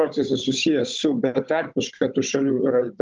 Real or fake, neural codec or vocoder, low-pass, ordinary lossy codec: fake; codec, 16 kHz, 16 kbps, FunCodec, trained on Chinese and English, 50 frames a second; 7.2 kHz; Opus, 24 kbps